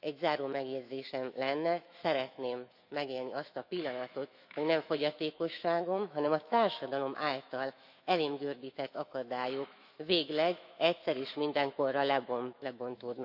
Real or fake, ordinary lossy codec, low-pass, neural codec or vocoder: fake; none; 5.4 kHz; autoencoder, 48 kHz, 128 numbers a frame, DAC-VAE, trained on Japanese speech